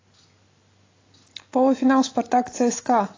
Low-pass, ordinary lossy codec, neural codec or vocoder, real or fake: 7.2 kHz; AAC, 32 kbps; none; real